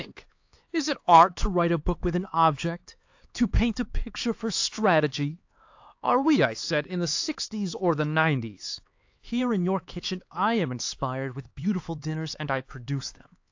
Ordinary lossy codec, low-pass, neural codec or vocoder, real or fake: AAC, 48 kbps; 7.2 kHz; codec, 16 kHz, 4 kbps, X-Codec, HuBERT features, trained on LibriSpeech; fake